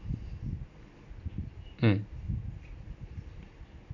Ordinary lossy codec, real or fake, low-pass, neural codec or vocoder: none; real; 7.2 kHz; none